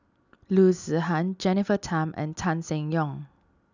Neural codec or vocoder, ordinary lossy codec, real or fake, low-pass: none; none; real; 7.2 kHz